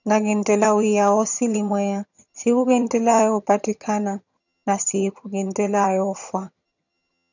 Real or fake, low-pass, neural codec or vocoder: fake; 7.2 kHz; vocoder, 22.05 kHz, 80 mel bands, HiFi-GAN